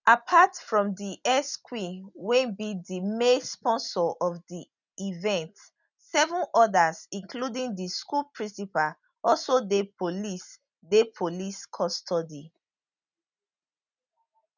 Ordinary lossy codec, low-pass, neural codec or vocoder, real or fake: none; 7.2 kHz; none; real